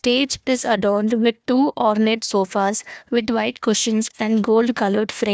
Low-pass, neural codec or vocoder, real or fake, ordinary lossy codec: none; codec, 16 kHz, 1 kbps, FunCodec, trained on Chinese and English, 50 frames a second; fake; none